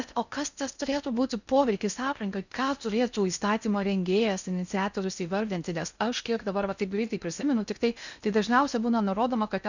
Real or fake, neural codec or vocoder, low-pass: fake; codec, 16 kHz in and 24 kHz out, 0.6 kbps, FocalCodec, streaming, 4096 codes; 7.2 kHz